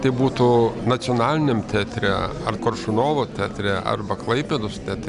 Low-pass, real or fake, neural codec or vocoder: 10.8 kHz; real; none